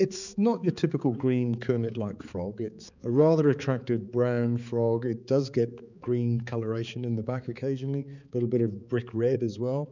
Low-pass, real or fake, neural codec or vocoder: 7.2 kHz; fake; codec, 16 kHz, 4 kbps, X-Codec, HuBERT features, trained on balanced general audio